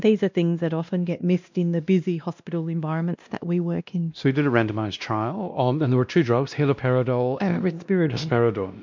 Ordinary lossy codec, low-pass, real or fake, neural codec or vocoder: MP3, 64 kbps; 7.2 kHz; fake; codec, 16 kHz, 1 kbps, X-Codec, WavLM features, trained on Multilingual LibriSpeech